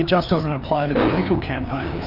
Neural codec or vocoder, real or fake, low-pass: codec, 16 kHz, 2 kbps, FreqCodec, larger model; fake; 5.4 kHz